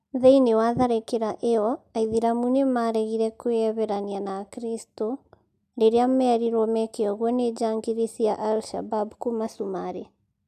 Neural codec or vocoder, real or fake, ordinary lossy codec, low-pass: none; real; none; 14.4 kHz